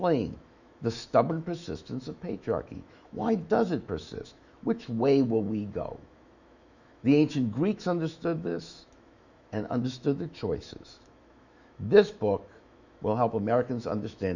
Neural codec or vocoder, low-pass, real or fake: autoencoder, 48 kHz, 128 numbers a frame, DAC-VAE, trained on Japanese speech; 7.2 kHz; fake